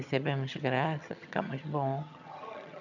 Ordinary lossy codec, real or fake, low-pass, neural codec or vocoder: none; fake; 7.2 kHz; vocoder, 22.05 kHz, 80 mel bands, HiFi-GAN